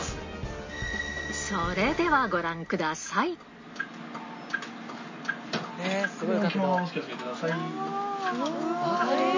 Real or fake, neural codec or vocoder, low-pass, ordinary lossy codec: real; none; 7.2 kHz; MP3, 32 kbps